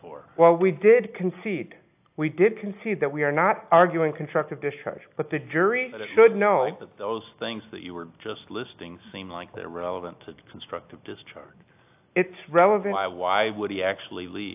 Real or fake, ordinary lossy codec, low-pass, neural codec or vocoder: real; AAC, 32 kbps; 3.6 kHz; none